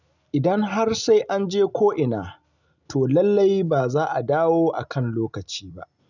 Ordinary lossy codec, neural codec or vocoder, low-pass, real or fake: none; none; 7.2 kHz; real